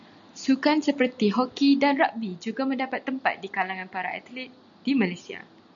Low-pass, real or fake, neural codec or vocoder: 7.2 kHz; real; none